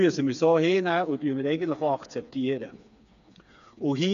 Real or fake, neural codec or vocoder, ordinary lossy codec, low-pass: fake; codec, 16 kHz, 4 kbps, FreqCodec, smaller model; none; 7.2 kHz